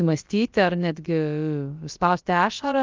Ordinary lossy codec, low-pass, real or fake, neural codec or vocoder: Opus, 32 kbps; 7.2 kHz; fake; codec, 16 kHz, about 1 kbps, DyCAST, with the encoder's durations